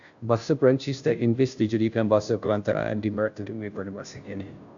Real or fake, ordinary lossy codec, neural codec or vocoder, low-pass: fake; AAC, 64 kbps; codec, 16 kHz, 0.5 kbps, FunCodec, trained on Chinese and English, 25 frames a second; 7.2 kHz